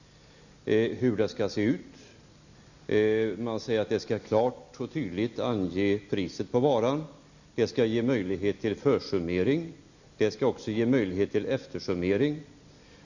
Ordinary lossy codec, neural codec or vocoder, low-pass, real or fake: none; none; 7.2 kHz; real